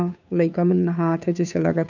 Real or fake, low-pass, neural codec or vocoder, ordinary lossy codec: fake; 7.2 kHz; codec, 16 kHz, 2 kbps, FunCodec, trained on Chinese and English, 25 frames a second; none